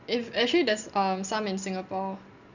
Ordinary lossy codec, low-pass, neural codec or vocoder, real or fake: none; 7.2 kHz; none; real